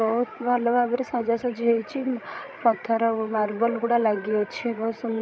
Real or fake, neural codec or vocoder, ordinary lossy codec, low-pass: fake; codec, 16 kHz, 16 kbps, FreqCodec, larger model; none; none